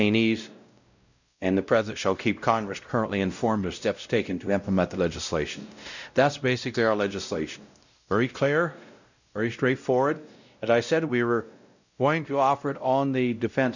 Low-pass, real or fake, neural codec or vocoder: 7.2 kHz; fake; codec, 16 kHz, 0.5 kbps, X-Codec, WavLM features, trained on Multilingual LibriSpeech